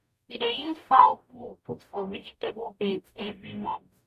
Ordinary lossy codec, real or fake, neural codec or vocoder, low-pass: none; fake; codec, 44.1 kHz, 0.9 kbps, DAC; 14.4 kHz